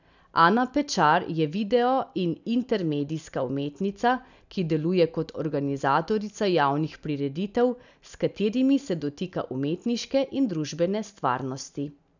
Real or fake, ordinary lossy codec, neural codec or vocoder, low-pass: real; none; none; 7.2 kHz